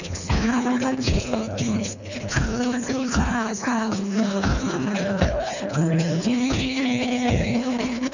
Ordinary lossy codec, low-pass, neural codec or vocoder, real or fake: none; 7.2 kHz; codec, 24 kHz, 1.5 kbps, HILCodec; fake